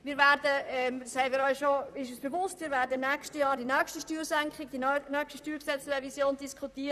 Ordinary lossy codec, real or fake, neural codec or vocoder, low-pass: none; fake; vocoder, 44.1 kHz, 128 mel bands, Pupu-Vocoder; 14.4 kHz